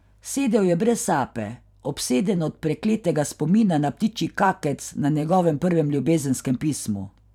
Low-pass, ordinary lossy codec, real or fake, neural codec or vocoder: 19.8 kHz; none; fake; vocoder, 44.1 kHz, 128 mel bands every 512 samples, BigVGAN v2